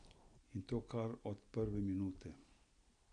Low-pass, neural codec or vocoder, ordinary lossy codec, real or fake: 9.9 kHz; none; none; real